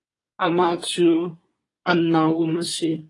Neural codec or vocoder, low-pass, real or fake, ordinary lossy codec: codec, 24 kHz, 1 kbps, SNAC; 10.8 kHz; fake; AAC, 32 kbps